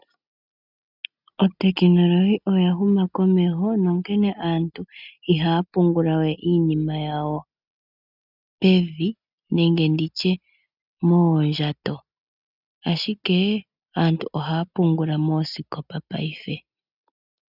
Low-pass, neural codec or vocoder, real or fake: 5.4 kHz; none; real